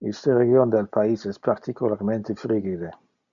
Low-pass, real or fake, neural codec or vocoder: 7.2 kHz; real; none